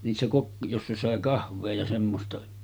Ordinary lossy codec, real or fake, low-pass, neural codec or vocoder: none; real; none; none